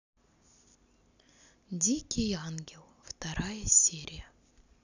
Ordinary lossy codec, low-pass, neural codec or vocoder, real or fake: none; 7.2 kHz; none; real